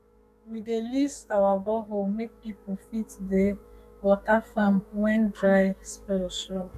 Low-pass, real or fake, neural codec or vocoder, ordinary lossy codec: 14.4 kHz; fake; codec, 32 kHz, 1.9 kbps, SNAC; none